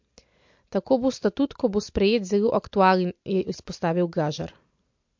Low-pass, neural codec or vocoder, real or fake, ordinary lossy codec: 7.2 kHz; none; real; MP3, 48 kbps